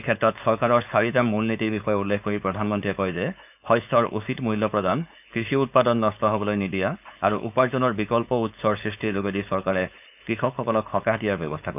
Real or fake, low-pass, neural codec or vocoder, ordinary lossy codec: fake; 3.6 kHz; codec, 16 kHz, 4.8 kbps, FACodec; none